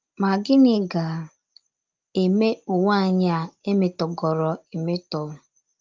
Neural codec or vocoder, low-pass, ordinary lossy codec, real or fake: none; 7.2 kHz; Opus, 32 kbps; real